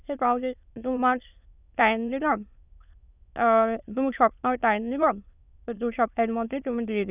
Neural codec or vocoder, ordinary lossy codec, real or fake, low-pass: autoencoder, 22.05 kHz, a latent of 192 numbers a frame, VITS, trained on many speakers; none; fake; 3.6 kHz